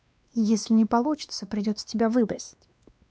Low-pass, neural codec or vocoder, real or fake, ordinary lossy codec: none; codec, 16 kHz, 2 kbps, X-Codec, WavLM features, trained on Multilingual LibriSpeech; fake; none